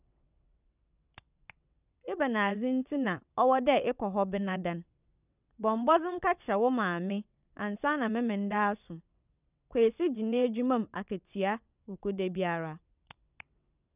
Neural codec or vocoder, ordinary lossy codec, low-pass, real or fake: vocoder, 22.05 kHz, 80 mel bands, WaveNeXt; none; 3.6 kHz; fake